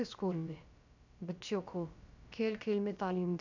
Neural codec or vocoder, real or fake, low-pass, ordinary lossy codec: codec, 16 kHz, about 1 kbps, DyCAST, with the encoder's durations; fake; 7.2 kHz; none